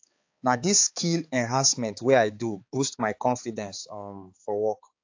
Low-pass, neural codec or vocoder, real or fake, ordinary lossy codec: 7.2 kHz; codec, 16 kHz, 4 kbps, X-Codec, HuBERT features, trained on balanced general audio; fake; AAC, 48 kbps